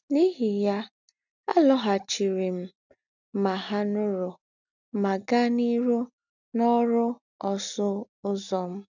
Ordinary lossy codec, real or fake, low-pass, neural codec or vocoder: none; real; 7.2 kHz; none